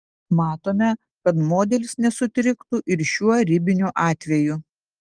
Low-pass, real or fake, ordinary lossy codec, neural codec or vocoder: 9.9 kHz; real; Opus, 24 kbps; none